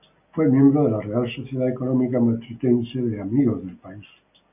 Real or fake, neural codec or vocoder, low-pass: real; none; 3.6 kHz